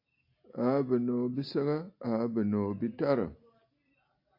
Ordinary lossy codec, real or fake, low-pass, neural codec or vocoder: AAC, 48 kbps; real; 5.4 kHz; none